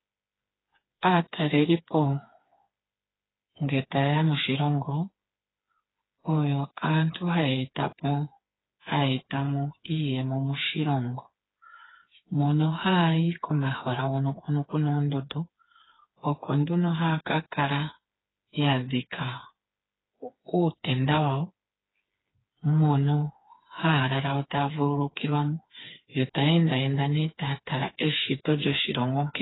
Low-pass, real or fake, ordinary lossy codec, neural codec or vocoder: 7.2 kHz; fake; AAC, 16 kbps; codec, 16 kHz, 4 kbps, FreqCodec, smaller model